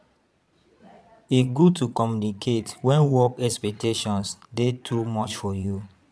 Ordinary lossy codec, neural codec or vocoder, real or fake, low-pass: none; vocoder, 22.05 kHz, 80 mel bands, Vocos; fake; none